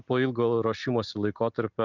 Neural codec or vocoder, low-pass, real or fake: none; 7.2 kHz; real